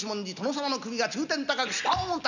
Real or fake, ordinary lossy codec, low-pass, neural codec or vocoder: real; none; 7.2 kHz; none